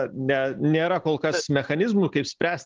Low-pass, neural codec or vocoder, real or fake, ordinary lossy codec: 7.2 kHz; none; real; Opus, 32 kbps